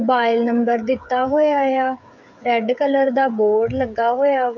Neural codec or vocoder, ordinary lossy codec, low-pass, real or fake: codec, 16 kHz, 8 kbps, FreqCodec, smaller model; none; 7.2 kHz; fake